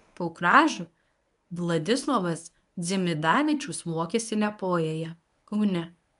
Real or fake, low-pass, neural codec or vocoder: fake; 10.8 kHz; codec, 24 kHz, 0.9 kbps, WavTokenizer, medium speech release version 1